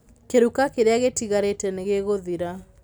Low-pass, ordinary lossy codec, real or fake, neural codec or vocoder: none; none; real; none